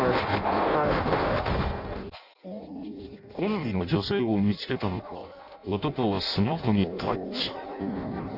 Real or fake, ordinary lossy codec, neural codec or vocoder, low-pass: fake; none; codec, 16 kHz in and 24 kHz out, 0.6 kbps, FireRedTTS-2 codec; 5.4 kHz